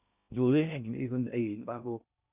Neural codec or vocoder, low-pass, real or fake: codec, 16 kHz in and 24 kHz out, 0.6 kbps, FocalCodec, streaming, 2048 codes; 3.6 kHz; fake